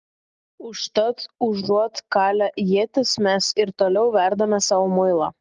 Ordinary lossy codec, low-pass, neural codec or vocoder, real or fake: Opus, 24 kbps; 7.2 kHz; none; real